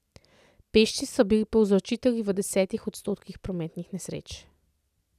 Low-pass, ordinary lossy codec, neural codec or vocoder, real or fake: 14.4 kHz; none; none; real